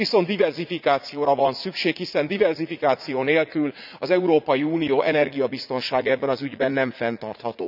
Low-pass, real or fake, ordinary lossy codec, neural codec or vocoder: 5.4 kHz; fake; none; vocoder, 44.1 kHz, 80 mel bands, Vocos